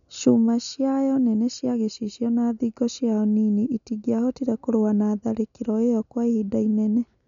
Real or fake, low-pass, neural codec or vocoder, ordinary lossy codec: real; 7.2 kHz; none; none